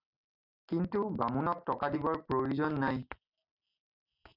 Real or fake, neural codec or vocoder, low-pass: real; none; 5.4 kHz